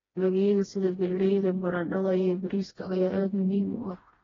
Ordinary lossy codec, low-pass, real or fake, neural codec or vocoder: AAC, 24 kbps; 7.2 kHz; fake; codec, 16 kHz, 1 kbps, FreqCodec, smaller model